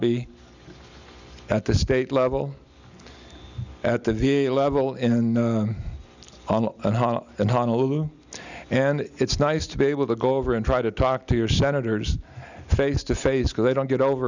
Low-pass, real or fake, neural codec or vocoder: 7.2 kHz; real; none